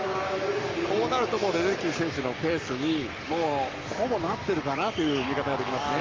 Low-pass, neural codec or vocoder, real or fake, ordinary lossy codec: 7.2 kHz; codec, 44.1 kHz, 7.8 kbps, Pupu-Codec; fake; Opus, 32 kbps